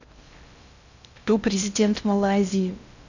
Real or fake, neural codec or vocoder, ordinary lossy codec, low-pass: fake; codec, 16 kHz in and 24 kHz out, 0.6 kbps, FocalCodec, streaming, 4096 codes; none; 7.2 kHz